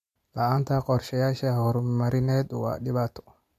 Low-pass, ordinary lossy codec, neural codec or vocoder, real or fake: 14.4 kHz; MP3, 64 kbps; none; real